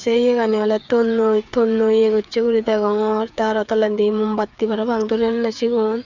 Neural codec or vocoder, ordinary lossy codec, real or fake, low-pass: codec, 16 kHz, 8 kbps, FreqCodec, smaller model; none; fake; 7.2 kHz